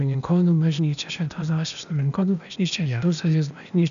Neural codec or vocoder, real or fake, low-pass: codec, 16 kHz, 0.8 kbps, ZipCodec; fake; 7.2 kHz